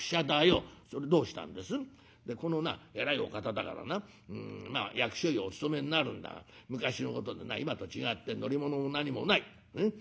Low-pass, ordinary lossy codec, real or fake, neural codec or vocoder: none; none; real; none